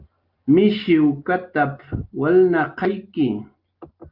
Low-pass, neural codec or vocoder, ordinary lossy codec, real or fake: 5.4 kHz; none; Opus, 24 kbps; real